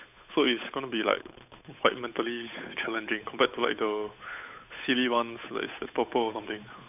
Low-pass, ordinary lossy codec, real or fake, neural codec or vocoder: 3.6 kHz; none; fake; codec, 16 kHz, 8 kbps, FunCodec, trained on Chinese and English, 25 frames a second